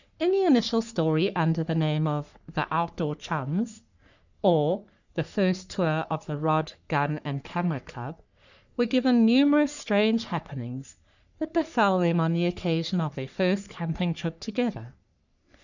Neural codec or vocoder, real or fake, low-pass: codec, 44.1 kHz, 3.4 kbps, Pupu-Codec; fake; 7.2 kHz